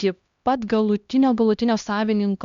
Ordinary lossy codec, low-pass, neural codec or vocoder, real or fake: Opus, 64 kbps; 7.2 kHz; codec, 16 kHz, 1 kbps, X-Codec, HuBERT features, trained on LibriSpeech; fake